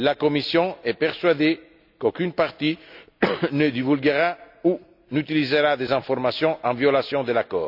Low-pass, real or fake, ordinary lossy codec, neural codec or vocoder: 5.4 kHz; real; none; none